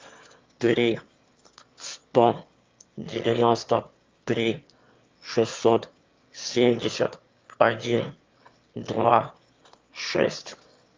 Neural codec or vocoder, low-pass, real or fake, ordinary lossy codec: autoencoder, 22.05 kHz, a latent of 192 numbers a frame, VITS, trained on one speaker; 7.2 kHz; fake; Opus, 24 kbps